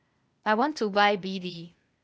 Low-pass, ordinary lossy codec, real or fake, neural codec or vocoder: none; none; fake; codec, 16 kHz, 0.8 kbps, ZipCodec